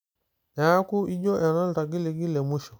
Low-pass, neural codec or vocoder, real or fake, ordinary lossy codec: none; none; real; none